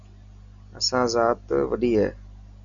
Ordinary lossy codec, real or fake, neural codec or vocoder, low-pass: MP3, 96 kbps; real; none; 7.2 kHz